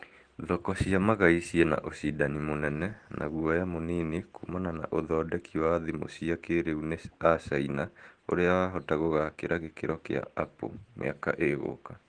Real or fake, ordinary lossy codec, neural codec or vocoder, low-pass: real; Opus, 16 kbps; none; 9.9 kHz